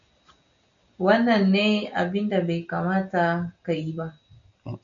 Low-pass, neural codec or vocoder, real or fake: 7.2 kHz; none; real